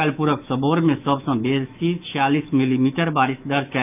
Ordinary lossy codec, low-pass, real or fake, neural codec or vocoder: none; 3.6 kHz; fake; autoencoder, 48 kHz, 128 numbers a frame, DAC-VAE, trained on Japanese speech